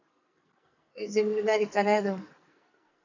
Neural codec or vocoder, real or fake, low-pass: codec, 44.1 kHz, 2.6 kbps, SNAC; fake; 7.2 kHz